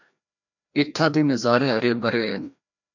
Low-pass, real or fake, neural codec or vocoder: 7.2 kHz; fake; codec, 16 kHz, 1 kbps, FreqCodec, larger model